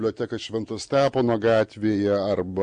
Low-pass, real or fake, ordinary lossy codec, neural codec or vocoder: 9.9 kHz; real; MP3, 64 kbps; none